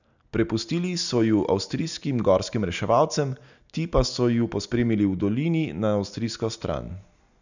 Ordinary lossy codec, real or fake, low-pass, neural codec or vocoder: none; real; 7.2 kHz; none